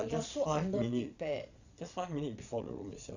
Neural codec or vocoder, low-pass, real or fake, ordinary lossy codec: vocoder, 22.05 kHz, 80 mel bands, Vocos; 7.2 kHz; fake; none